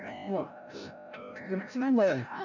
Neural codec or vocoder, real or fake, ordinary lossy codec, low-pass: codec, 16 kHz, 0.5 kbps, FreqCodec, larger model; fake; none; 7.2 kHz